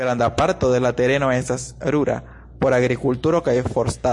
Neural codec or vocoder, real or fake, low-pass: none; real; 10.8 kHz